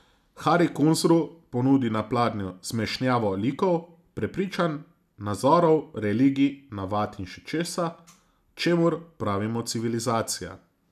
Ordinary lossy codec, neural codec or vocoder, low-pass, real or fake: none; none; 14.4 kHz; real